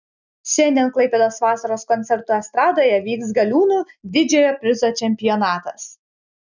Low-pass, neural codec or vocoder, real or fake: 7.2 kHz; none; real